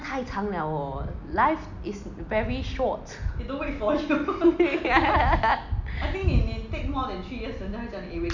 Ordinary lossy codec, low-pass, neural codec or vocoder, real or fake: none; 7.2 kHz; none; real